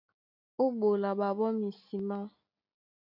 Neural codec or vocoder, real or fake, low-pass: none; real; 5.4 kHz